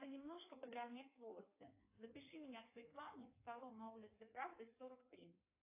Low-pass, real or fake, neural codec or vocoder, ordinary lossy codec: 3.6 kHz; fake; codec, 16 kHz in and 24 kHz out, 1.1 kbps, FireRedTTS-2 codec; AAC, 24 kbps